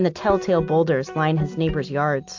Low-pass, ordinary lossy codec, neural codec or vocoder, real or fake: 7.2 kHz; AAC, 48 kbps; none; real